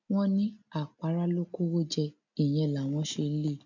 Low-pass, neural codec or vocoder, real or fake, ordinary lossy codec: 7.2 kHz; none; real; none